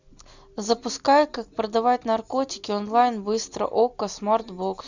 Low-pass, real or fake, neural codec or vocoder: 7.2 kHz; real; none